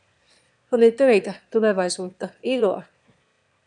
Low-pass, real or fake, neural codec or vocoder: 9.9 kHz; fake; autoencoder, 22.05 kHz, a latent of 192 numbers a frame, VITS, trained on one speaker